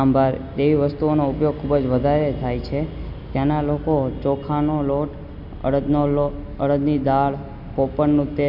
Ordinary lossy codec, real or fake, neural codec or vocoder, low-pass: none; real; none; 5.4 kHz